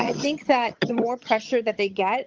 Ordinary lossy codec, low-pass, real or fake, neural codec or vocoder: Opus, 24 kbps; 7.2 kHz; fake; vocoder, 22.05 kHz, 80 mel bands, HiFi-GAN